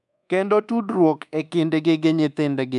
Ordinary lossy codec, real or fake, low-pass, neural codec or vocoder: none; fake; 10.8 kHz; codec, 24 kHz, 1.2 kbps, DualCodec